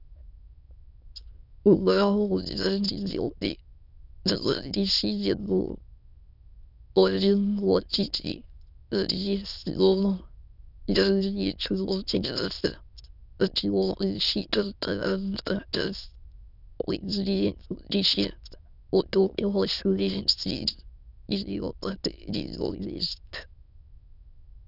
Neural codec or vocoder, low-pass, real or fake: autoencoder, 22.05 kHz, a latent of 192 numbers a frame, VITS, trained on many speakers; 5.4 kHz; fake